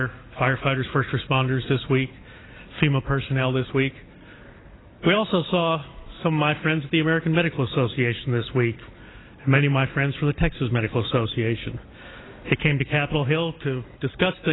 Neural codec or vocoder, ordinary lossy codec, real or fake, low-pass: none; AAC, 16 kbps; real; 7.2 kHz